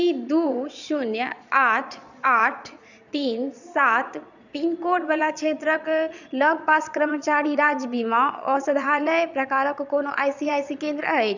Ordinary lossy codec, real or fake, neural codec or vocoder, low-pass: none; fake; vocoder, 22.05 kHz, 80 mel bands, Vocos; 7.2 kHz